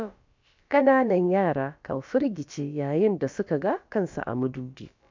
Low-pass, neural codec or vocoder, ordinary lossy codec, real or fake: 7.2 kHz; codec, 16 kHz, about 1 kbps, DyCAST, with the encoder's durations; MP3, 64 kbps; fake